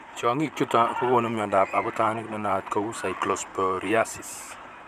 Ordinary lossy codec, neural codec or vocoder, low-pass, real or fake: none; vocoder, 44.1 kHz, 128 mel bands, Pupu-Vocoder; 14.4 kHz; fake